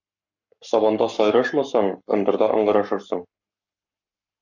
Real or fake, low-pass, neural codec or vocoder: fake; 7.2 kHz; codec, 44.1 kHz, 7.8 kbps, Pupu-Codec